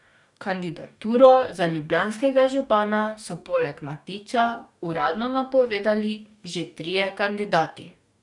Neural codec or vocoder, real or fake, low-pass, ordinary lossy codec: codec, 44.1 kHz, 2.6 kbps, DAC; fake; 10.8 kHz; none